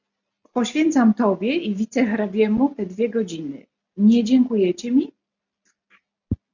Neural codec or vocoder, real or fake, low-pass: none; real; 7.2 kHz